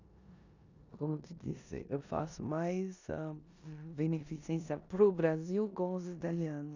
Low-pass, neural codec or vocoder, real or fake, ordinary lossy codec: 7.2 kHz; codec, 16 kHz in and 24 kHz out, 0.9 kbps, LongCat-Audio-Codec, four codebook decoder; fake; none